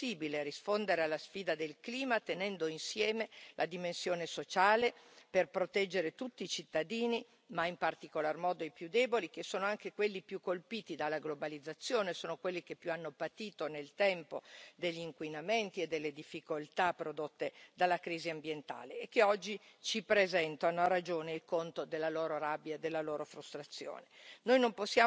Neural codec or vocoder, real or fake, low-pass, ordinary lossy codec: none; real; none; none